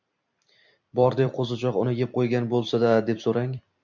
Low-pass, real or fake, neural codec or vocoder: 7.2 kHz; fake; vocoder, 44.1 kHz, 128 mel bands every 256 samples, BigVGAN v2